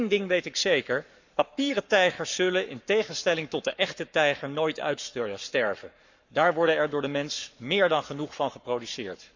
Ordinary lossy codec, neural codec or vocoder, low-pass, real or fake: none; codec, 44.1 kHz, 7.8 kbps, Pupu-Codec; 7.2 kHz; fake